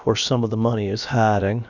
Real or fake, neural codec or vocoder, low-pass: fake; codec, 16 kHz, about 1 kbps, DyCAST, with the encoder's durations; 7.2 kHz